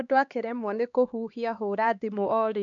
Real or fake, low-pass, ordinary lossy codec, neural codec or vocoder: fake; 7.2 kHz; none; codec, 16 kHz, 2 kbps, X-Codec, HuBERT features, trained on LibriSpeech